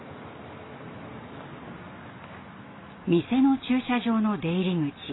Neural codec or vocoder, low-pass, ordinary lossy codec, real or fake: none; 7.2 kHz; AAC, 16 kbps; real